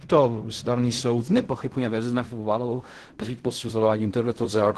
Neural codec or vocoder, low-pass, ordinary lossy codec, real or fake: codec, 16 kHz in and 24 kHz out, 0.4 kbps, LongCat-Audio-Codec, fine tuned four codebook decoder; 10.8 kHz; Opus, 16 kbps; fake